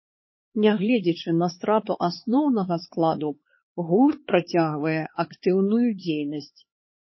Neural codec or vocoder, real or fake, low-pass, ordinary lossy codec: codec, 16 kHz, 4 kbps, X-Codec, HuBERT features, trained on LibriSpeech; fake; 7.2 kHz; MP3, 24 kbps